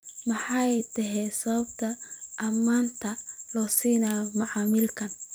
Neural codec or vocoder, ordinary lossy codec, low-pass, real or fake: vocoder, 44.1 kHz, 128 mel bands every 256 samples, BigVGAN v2; none; none; fake